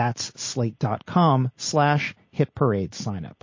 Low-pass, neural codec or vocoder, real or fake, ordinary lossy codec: 7.2 kHz; none; real; MP3, 32 kbps